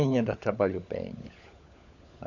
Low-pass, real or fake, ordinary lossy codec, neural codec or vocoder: 7.2 kHz; fake; none; codec, 16 kHz, 16 kbps, FunCodec, trained on LibriTTS, 50 frames a second